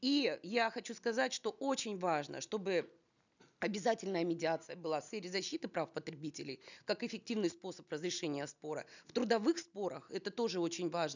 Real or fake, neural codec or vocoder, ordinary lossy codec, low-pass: real; none; none; 7.2 kHz